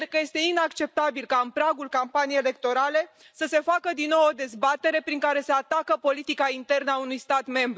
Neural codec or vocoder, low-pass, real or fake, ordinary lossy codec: none; none; real; none